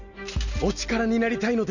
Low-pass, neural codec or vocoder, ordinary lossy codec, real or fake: 7.2 kHz; none; none; real